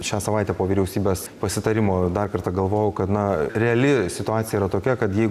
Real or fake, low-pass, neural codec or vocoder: real; 14.4 kHz; none